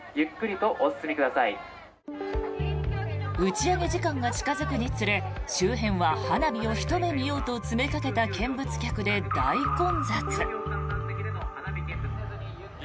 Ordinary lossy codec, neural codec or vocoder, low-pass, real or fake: none; none; none; real